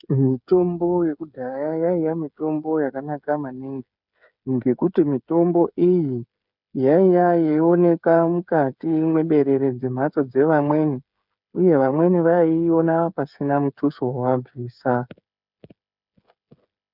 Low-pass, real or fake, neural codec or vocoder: 5.4 kHz; fake; codec, 16 kHz, 8 kbps, FreqCodec, smaller model